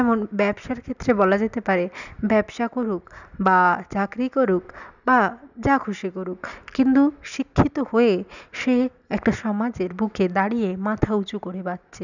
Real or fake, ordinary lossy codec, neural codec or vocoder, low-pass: real; none; none; 7.2 kHz